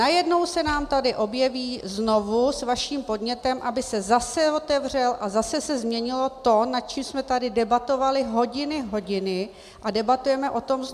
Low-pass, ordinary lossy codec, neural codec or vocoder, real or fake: 14.4 kHz; MP3, 96 kbps; none; real